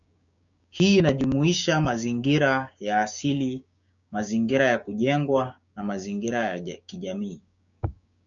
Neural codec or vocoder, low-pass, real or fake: codec, 16 kHz, 6 kbps, DAC; 7.2 kHz; fake